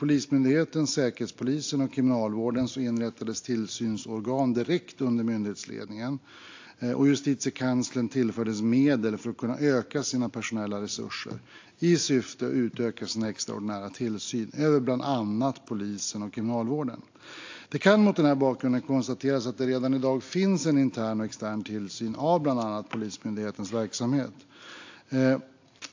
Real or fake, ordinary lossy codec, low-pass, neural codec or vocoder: real; AAC, 48 kbps; 7.2 kHz; none